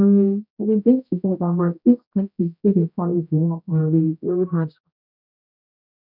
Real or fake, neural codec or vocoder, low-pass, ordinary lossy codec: fake; codec, 16 kHz, 0.5 kbps, X-Codec, HuBERT features, trained on general audio; 5.4 kHz; none